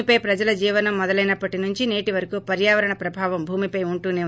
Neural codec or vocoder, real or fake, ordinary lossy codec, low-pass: none; real; none; none